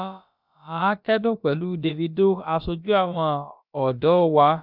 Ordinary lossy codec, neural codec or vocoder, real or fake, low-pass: none; codec, 16 kHz, about 1 kbps, DyCAST, with the encoder's durations; fake; 5.4 kHz